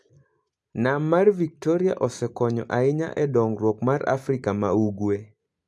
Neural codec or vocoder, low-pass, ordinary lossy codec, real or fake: none; none; none; real